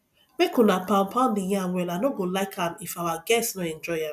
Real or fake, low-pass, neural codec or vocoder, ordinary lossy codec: real; 14.4 kHz; none; none